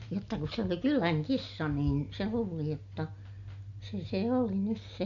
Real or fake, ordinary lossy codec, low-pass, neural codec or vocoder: real; none; 7.2 kHz; none